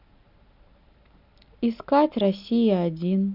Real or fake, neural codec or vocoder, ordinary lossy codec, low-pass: real; none; none; 5.4 kHz